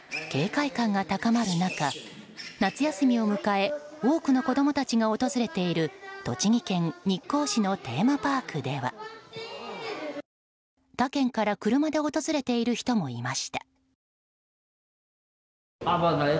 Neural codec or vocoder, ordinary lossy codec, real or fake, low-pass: none; none; real; none